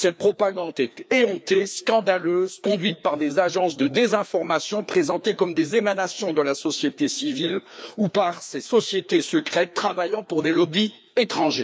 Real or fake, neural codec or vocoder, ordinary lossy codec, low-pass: fake; codec, 16 kHz, 2 kbps, FreqCodec, larger model; none; none